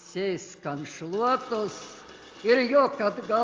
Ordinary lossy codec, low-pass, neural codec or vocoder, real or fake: Opus, 32 kbps; 7.2 kHz; none; real